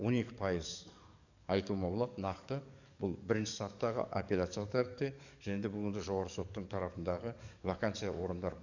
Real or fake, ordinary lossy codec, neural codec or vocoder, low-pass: fake; none; codec, 44.1 kHz, 7.8 kbps, DAC; 7.2 kHz